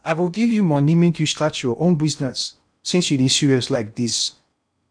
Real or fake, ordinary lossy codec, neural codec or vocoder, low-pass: fake; none; codec, 16 kHz in and 24 kHz out, 0.6 kbps, FocalCodec, streaming, 2048 codes; 9.9 kHz